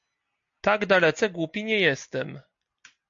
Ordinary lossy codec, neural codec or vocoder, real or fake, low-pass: MP3, 48 kbps; none; real; 7.2 kHz